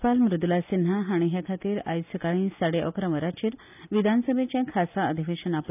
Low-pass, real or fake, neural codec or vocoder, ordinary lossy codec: 3.6 kHz; real; none; none